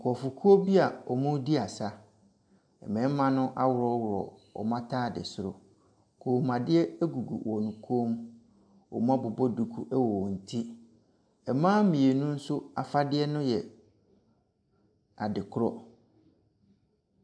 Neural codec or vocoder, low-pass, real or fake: none; 9.9 kHz; real